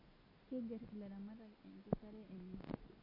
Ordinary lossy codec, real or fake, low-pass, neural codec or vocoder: MP3, 32 kbps; real; 5.4 kHz; none